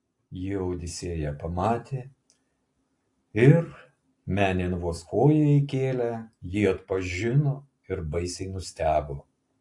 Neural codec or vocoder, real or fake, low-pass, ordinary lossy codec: none; real; 10.8 kHz; AAC, 48 kbps